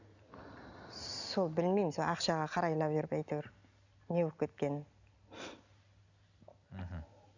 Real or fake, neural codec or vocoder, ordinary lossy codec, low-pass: real; none; none; 7.2 kHz